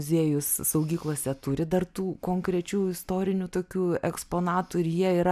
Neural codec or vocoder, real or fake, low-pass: none; real; 14.4 kHz